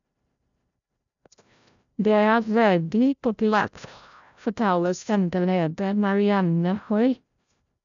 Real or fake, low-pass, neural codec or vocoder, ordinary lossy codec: fake; 7.2 kHz; codec, 16 kHz, 0.5 kbps, FreqCodec, larger model; none